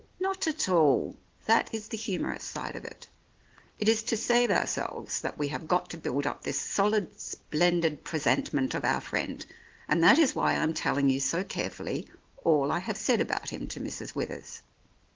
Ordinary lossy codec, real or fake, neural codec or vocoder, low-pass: Opus, 16 kbps; real; none; 7.2 kHz